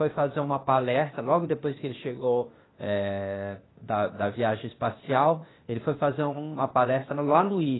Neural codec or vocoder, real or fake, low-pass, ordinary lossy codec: codec, 16 kHz, 0.8 kbps, ZipCodec; fake; 7.2 kHz; AAC, 16 kbps